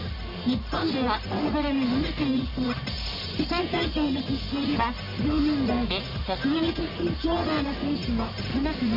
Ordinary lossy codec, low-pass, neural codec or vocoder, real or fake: MP3, 32 kbps; 5.4 kHz; codec, 44.1 kHz, 1.7 kbps, Pupu-Codec; fake